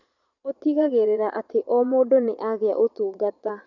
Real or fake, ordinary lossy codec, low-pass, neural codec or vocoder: fake; none; 7.2 kHz; vocoder, 22.05 kHz, 80 mel bands, Vocos